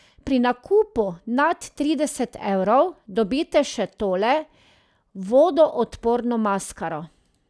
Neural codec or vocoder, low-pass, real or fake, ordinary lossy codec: none; none; real; none